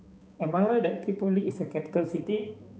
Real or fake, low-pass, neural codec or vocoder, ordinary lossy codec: fake; none; codec, 16 kHz, 4 kbps, X-Codec, HuBERT features, trained on balanced general audio; none